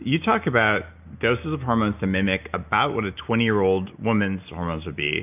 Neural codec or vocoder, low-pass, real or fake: none; 3.6 kHz; real